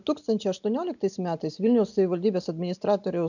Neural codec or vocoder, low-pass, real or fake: none; 7.2 kHz; real